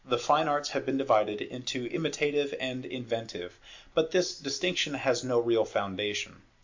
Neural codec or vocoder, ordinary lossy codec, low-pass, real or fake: none; MP3, 48 kbps; 7.2 kHz; real